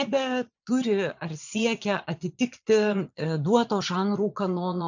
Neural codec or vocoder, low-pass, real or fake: vocoder, 22.05 kHz, 80 mel bands, Vocos; 7.2 kHz; fake